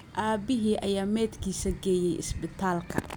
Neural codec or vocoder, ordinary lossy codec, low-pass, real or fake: none; none; none; real